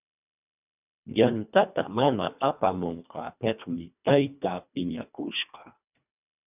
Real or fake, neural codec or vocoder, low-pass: fake; codec, 24 kHz, 1.5 kbps, HILCodec; 3.6 kHz